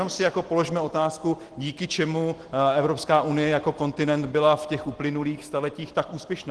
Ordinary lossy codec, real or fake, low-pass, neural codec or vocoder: Opus, 16 kbps; real; 10.8 kHz; none